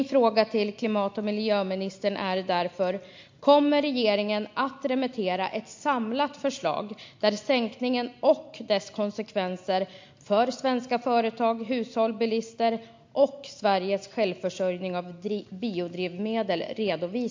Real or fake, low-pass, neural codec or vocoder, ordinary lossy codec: real; 7.2 kHz; none; MP3, 48 kbps